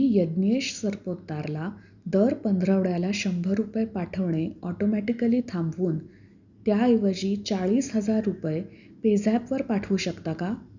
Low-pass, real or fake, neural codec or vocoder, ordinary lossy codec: 7.2 kHz; real; none; none